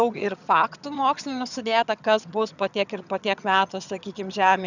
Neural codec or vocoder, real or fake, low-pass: vocoder, 22.05 kHz, 80 mel bands, HiFi-GAN; fake; 7.2 kHz